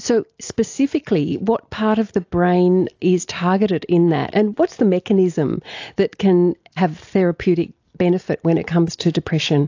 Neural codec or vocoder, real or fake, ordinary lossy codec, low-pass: none; real; AAC, 48 kbps; 7.2 kHz